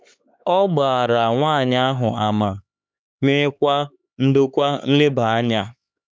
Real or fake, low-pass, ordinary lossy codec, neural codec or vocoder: fake; none; none; codec, 16 kHz, 4 kbps, X-Codec, HuBERT features, trained on LibriSpeech